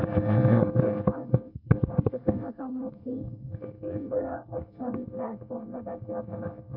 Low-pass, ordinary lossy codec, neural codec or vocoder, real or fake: 5.4 kHz; none; codec, 24 kHz, 1 kbps, SNAC; fake